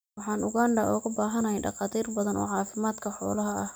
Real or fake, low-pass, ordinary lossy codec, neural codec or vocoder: real; none; none; none